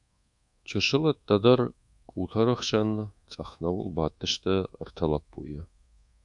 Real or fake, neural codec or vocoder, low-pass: fake; codec, 24 kHz, 1.2 kbps, DualCodec; 10.8 kHz